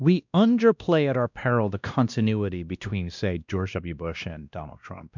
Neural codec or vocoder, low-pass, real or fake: codec, 16 kHz, 1 kbps, X-Codec, WavLM features, trained on Multilingual LibriSpeech; 7.2 kHz; fake